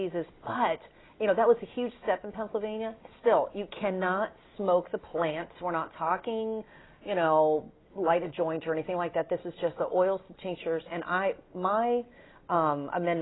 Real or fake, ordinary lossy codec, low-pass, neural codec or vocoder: real; AAC, 16 kbps; 7.2 kHz; none